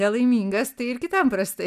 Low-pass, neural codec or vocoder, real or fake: 14.4 kHz; none; real